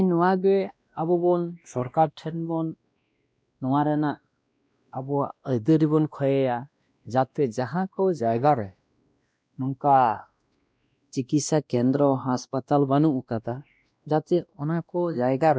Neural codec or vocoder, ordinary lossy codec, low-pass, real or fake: codec, 16 kHz, 1 kbps, X-Codec, WavLM features, trained on Multilingual LibriSpeech; none; none; fake